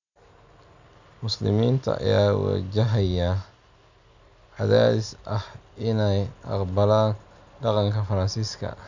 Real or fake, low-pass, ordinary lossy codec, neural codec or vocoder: real; 7.2 kHz; none; none